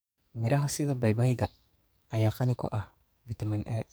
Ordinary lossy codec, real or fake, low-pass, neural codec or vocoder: none; fake; none; codec, 44.1 kHz, 2.6 kbps, SNAC